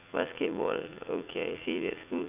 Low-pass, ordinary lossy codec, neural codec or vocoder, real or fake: 3.6 kHz; none; vocoder, 44.1 kHz, 80 mel bands, Vocos; fake